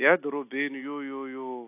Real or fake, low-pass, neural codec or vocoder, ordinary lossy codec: real; 3.6 kHz; none; AAC, 24 kbps